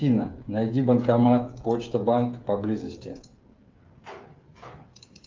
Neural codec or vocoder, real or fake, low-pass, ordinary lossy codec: codec, 16 kHz, 8 kbps, FreqCodec, smaller model; fake; 7.2 kHz; Opus, 32 kbps